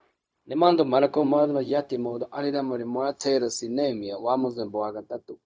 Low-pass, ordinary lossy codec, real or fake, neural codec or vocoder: none; none; fake; codec, 16 kHz, 0.4 kbps, LongCat-Audio-Codec